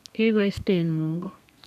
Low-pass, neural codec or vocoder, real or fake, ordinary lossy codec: 14.4 kHz; codec, 32 kHz, 1.9 kbps, SNAC; fake; none